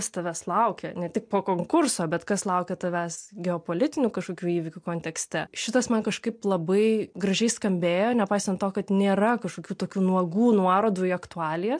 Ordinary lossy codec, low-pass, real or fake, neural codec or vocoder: MP3, 64 kbps; 9.9 kHz; real; none